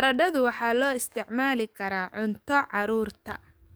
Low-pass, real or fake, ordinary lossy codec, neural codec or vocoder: none; fake; none; codec, 44.1 kHz, 7.8 kbps, DAC